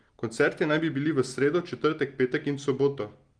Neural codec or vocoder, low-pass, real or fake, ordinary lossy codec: none; 9.9 kHz; real; Opus, 24 kbps